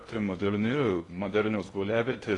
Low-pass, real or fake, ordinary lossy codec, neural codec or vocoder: 10.8 kHz; fake; AAC, 32 kbps; codec, 16 kHz in and 24 kHz out, 0.8 kbps, FocalCodec, streaming, 65536 codes